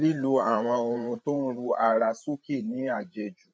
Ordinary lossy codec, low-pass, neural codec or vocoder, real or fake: none; none; codec, 16 kHz, 8 kbps, FreqCodec, larger model; fake